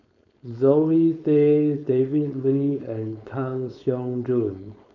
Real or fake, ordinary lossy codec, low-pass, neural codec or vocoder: fake; none; 7.2 kHz; codec, 16 kHz, 4.8 kbps, FACodec